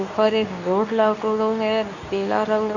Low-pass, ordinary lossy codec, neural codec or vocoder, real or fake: 7.2 kHz; none; codec, 24 kHz, 0.9 kbps, WavTokenizer, medium speech release version 2; fake